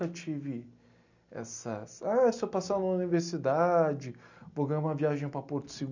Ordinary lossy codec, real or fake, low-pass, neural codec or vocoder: none; real; 7.2 kHz; none